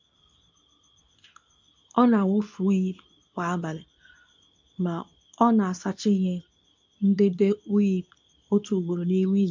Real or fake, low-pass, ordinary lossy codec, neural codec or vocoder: fake; 7.2 kHz; MP3, 64 kbps; codec, 24 kHz, 0.9 kbps, WavTokenizer, medium speech release version 2